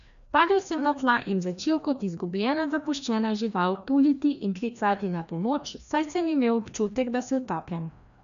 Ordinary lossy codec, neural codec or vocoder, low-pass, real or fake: none; codec, 16 kHz, 1 kbps, FreqCodec, larger model; 7.2 kHz; fake